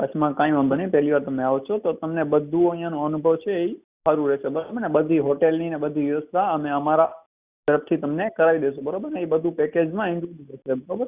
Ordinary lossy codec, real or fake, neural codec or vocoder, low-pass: Opus, 64 kbps; real; none; 3.6 kHz